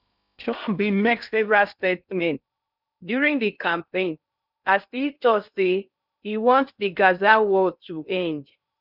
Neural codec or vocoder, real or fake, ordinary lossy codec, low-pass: codec, 16 kHz in and 24 kHz out, 0.6 kbps, FocalCodec, streaming, 2048 codes; fake; none; 5.4 kHz